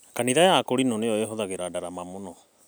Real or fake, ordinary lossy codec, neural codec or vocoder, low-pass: real; none; none; none